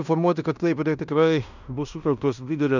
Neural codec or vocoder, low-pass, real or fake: codec, 16 kHz in and 24 kHz out, 0.9 kbps, LongCat-Audio-Codec, fine tuned four codebook decoder; 7.2 kHz; fake